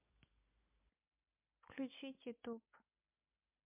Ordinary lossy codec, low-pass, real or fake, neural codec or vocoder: MP3, 24 kbps; 3.6 kHz; real; none